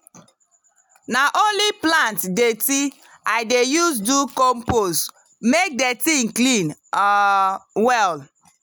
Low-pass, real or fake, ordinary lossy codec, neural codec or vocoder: none; real; none; none